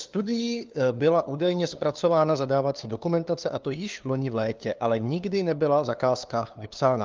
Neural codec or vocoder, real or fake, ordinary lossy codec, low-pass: codec, 16 kHz, 2 kbps, FunCodec, trained on LibriTTS, 25 frames a second; fake; Opus, 24 kbps; 7.2 kHz